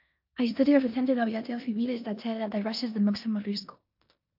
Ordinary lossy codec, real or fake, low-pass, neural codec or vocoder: MP3, 48 kbps; fake; 5.4 kHz; codec, 16 kHz in and 24 kHz out, 0.9 kbps, LongCat-Audio-Codec, four codebook decoder